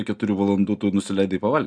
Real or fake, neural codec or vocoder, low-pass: real; none; 9.9 kHz